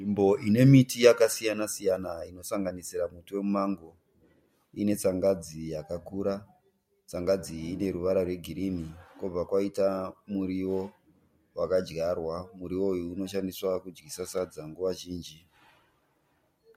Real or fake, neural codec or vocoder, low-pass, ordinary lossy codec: real; none; 19.8 kHz; MP3, 64 kbps